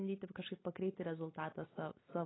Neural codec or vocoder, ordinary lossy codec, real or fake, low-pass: none; AAC, 16 kbps; real; 7.2 kHz